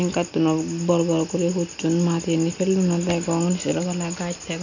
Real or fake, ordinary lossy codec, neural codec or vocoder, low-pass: real; none; none; 7.2 kHz